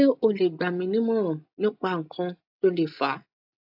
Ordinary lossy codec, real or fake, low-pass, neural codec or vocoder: AAC, 48 kbps; real; 5.4 kHz; none